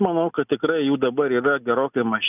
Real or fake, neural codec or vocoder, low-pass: real; none; 3.6 kHz